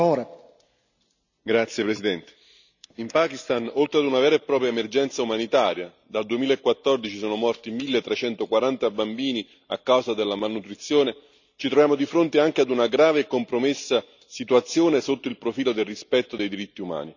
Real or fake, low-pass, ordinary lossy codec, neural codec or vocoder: real; 7.2 kHz; none; none